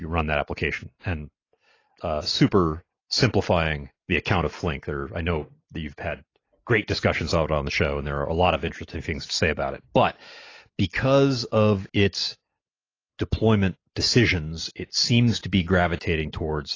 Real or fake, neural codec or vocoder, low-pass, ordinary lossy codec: real; none; 7.2 kHz; AAC, 32 kbps